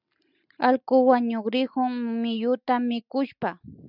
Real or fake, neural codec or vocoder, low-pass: real; none; 5.4 kHz